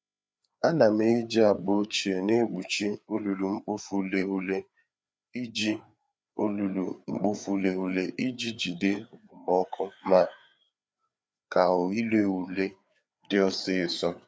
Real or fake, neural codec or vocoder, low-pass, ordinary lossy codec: fake; codec, 16 kHz, 4 kbps, FreqCodec, larger model; none; none